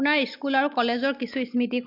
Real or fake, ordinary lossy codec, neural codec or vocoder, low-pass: real; MP3, 48 kbps; none; 5.4 kHz